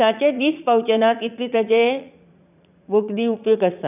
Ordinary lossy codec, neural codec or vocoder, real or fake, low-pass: none; vocoder, 44.1 kHz, 80 mel bands, Vocos; fake; 3.6 kHz